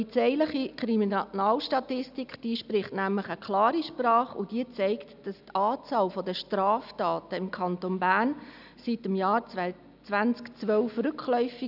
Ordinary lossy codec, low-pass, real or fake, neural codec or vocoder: none; 5.4 kHz; real; none